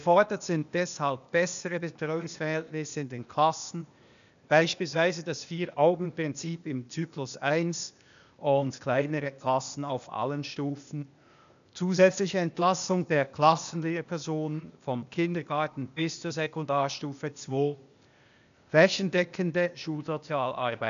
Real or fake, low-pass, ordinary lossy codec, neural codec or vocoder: fake; 7.2 kHz; none; codec, 16 kHz, 0.8 kbps, ZipCodec